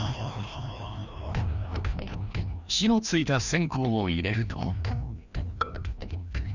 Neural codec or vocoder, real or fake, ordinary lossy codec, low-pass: codec, 16 kHz, 1 kbps, FreqCodec, larger model; fake; none; 7.2 kHz